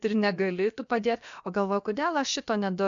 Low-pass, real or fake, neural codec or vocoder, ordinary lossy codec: 7.2 kHz; fake; codec, 16 kHz, about 1 kbps, DyCAST, with the encoder's durations; MP3, 64 kbps